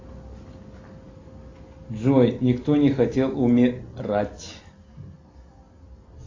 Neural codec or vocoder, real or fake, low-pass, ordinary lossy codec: none; real; 7.2 kHz; AAC, 32 kbps